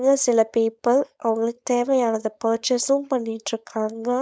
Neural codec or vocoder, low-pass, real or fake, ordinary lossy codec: codec, 16 kHz, 4.8 kbps, FACodec; none; fake; none